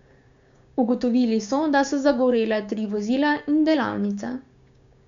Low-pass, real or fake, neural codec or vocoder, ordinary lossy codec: 7.2 kHz; fake; codec, 16 kHz, 6 kbps, DAC; MP3, 64 kbps